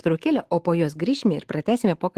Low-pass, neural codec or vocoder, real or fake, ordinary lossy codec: 14.4 kHz; autoencoder, 48 kHz, 128 numbers a frame, DAC-VAE, trained on Japanese speech; fake; Opus, 16 kbps